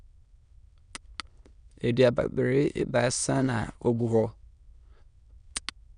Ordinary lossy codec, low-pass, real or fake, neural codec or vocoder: none; 9.9 kHz; fake; autoencoder, 22.05 kHz, a latent of 192 numbers a frame, VITS, trained on many speakers